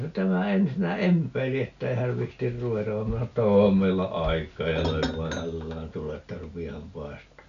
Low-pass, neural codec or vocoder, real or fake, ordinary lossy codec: 7.2 kHz; none; real; none